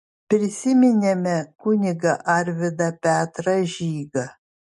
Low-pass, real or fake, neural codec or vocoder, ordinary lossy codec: 10.8 kHz; real; none; MP3, 48 kbps